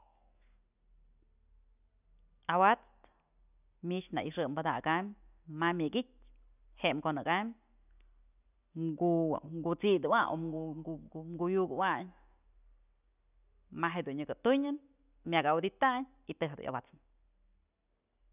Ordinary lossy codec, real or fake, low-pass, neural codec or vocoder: none; real; 3.6 kHz; none